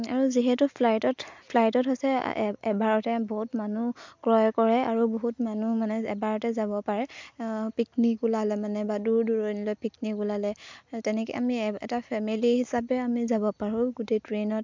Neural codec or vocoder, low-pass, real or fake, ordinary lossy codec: none; 7.2 kHz; real; MP3, 64 kbps